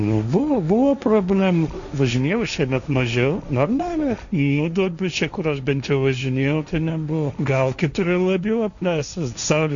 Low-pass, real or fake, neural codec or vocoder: 7.2 kHz; fake; codec, 16 kHz, 1.1 kbps, Voila-Tokenizer